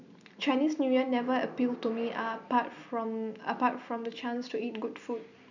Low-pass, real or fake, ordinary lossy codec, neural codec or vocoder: 7.2 kHz; real; none; none